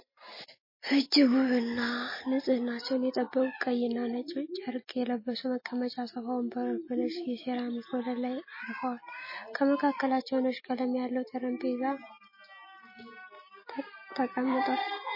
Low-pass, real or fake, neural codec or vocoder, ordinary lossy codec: 5.4 kHz; real; none; MP3, 24 kbps